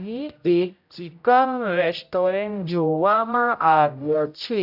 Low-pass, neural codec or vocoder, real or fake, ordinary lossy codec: 5.4 kHz; codec, 16 kHz, 0.5 kbps, X-Codec, HuBERT features, trained on general audio; fake; none